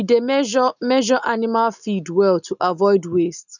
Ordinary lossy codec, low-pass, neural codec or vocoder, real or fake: none; 7.2 kHz; none; real